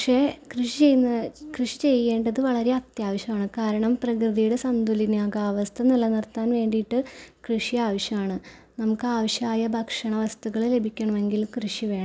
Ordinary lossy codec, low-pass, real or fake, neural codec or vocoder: none; none; real; none